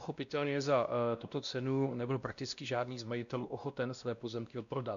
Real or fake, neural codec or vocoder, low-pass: fake; codec, 16 kHz, 1 kbps, X-Codec, WavLM features, trained on Multilingual LibriSpeech; 7.2 kHz